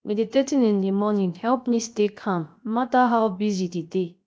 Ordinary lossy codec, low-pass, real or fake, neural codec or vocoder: none; none; fake; codec, 16 kHz, 0.7 kbps, FocalCodec